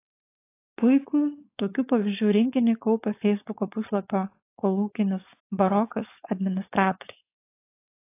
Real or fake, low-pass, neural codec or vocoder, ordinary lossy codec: fake; 3.6 kHz; codec, 16 kHz, 4.8 kbps, FACodec; AAC, 24 kbps